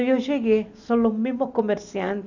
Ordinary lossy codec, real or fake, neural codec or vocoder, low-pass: none; real; none; 7.2 kHz